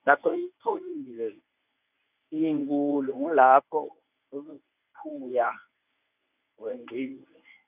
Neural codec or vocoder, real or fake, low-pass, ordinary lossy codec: codec, 24 kHz, 0.9 kbps, WavTokenizer, medium speech release version 1; fake; 3.6 kHz; none